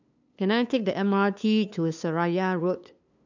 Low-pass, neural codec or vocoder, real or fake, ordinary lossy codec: 7.2 kHz; codec, 16 kHz, 2 kbps, FunCodec, trained on LibriTTS, 25 frames a second; fake; none